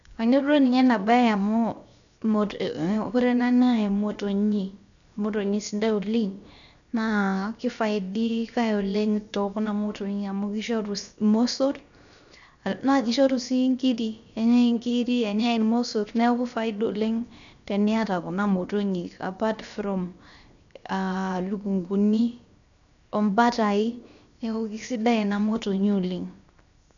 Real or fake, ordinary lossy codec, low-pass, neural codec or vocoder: fake; none; 7.2 kHz; codec, 16 kHz, 0.7 kbps, FocalCodec